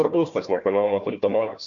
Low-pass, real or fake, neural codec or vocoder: 7.2 kHz; fake; codec, 16 kHz, 1 kbps, FunCodec, trained on Chinese and English, 50 frames a second